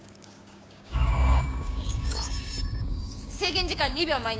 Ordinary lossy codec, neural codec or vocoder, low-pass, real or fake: none; codec, 16 kHz, 6 kbps, DAC; none; fake